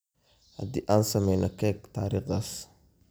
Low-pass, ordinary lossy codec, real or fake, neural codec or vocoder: none; none; real; none